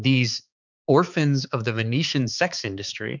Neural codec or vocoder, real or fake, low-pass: codec, 16 kHz, 4 kbps, X-Codec, HuBERT features, trained on balanced general audio; fake; 7.2 kHz